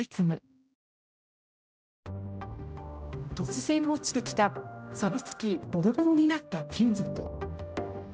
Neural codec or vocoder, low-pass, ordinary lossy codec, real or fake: codec, 16 kHz, 0.5 kbps, X-Codec, HuBERT features, trained on general audio; none; none; fake